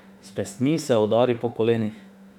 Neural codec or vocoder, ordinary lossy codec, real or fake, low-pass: autoencoder, 48 kHz, 32 numbers a frame, DAC-VAE, trained on Japanese speech; none; fake; 19.8 kHz